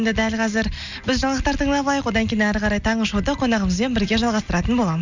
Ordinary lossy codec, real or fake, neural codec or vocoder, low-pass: none; real; none; 7.2 kHz